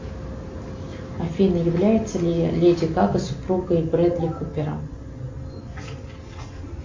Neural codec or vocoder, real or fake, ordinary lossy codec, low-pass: none; real; MP3, 48 kbps; 7.2 kHz